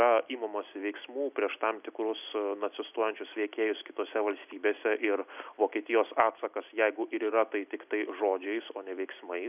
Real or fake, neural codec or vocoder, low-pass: real; none; 3.6 kHz